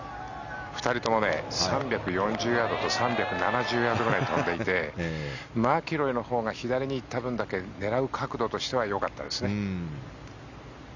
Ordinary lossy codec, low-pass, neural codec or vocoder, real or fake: none; 7.2 kHz; none; real